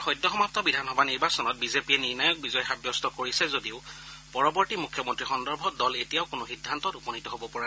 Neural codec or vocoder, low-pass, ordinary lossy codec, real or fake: none; none; none; real